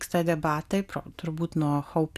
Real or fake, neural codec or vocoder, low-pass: real; none; 14.4 kHz